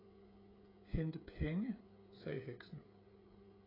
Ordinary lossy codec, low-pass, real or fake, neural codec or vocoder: AAC, 24 kbps; 5.4 kHz; fake; codec, 16 kHz, 16 kbps, FreqCodec, smaller model